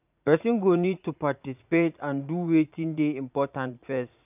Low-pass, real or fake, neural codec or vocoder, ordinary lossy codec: 3.6 kHz; fake; vocoder, 44.1 kHz, 80 mel bands, Vocos; none